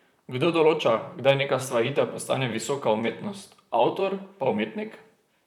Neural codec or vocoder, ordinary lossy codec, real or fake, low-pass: vocoder, 44.1 kHz, 128 mel bands, Pupu-Vocoder; none; fake; 19.8 kHz